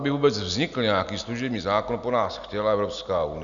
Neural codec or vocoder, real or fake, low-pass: none; real; 7.2 kHz